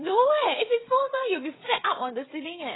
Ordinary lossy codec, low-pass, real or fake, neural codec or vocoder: AAC, 16 kbps; 7.2 kHz; fake; codec, 16 kHz, 16 kbps, FreqCodec, smaller model